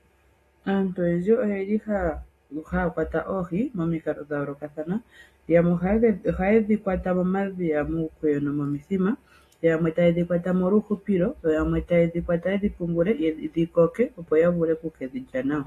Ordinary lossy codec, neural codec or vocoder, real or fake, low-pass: AAC, 48 kbps; none; real; 14.4 kHz